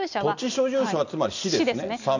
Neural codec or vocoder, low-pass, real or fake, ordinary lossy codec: none; 7.2 kHz; real; none